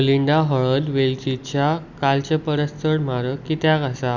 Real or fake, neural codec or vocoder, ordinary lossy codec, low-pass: real; none; Opus, 64 kbps; 7.2 kHz